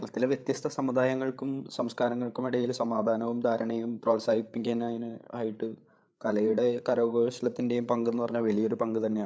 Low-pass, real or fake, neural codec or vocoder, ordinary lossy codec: none; fake; codec, 16 kHz, 8 kbps, FreqCodec, larger model; none